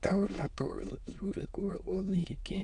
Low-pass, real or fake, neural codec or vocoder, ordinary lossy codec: 9.9 kHz; fake; autoencoder, 22.05 kHz, a latent of 192 numbers a frame, VITS, trained on many speakers; none